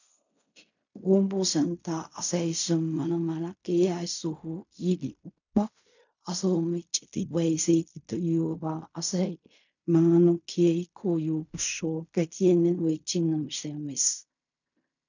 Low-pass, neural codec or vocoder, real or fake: 7.2 kHz; codec, 16 kHz in and 24 kHz out, 0.4 kbps, LongCat-Audio-Codec, fine tuned four codebook decoder; fake